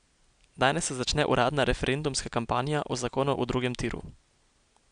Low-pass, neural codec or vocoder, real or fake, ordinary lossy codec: 9.9 kHz; none; real; none